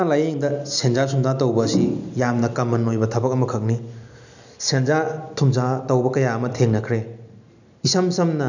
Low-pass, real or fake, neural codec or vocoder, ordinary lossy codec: 7.2 kHz; real; none; none